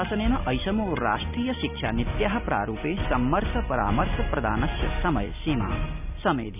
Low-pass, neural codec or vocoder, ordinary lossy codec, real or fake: 3.6 kHz; none; none; real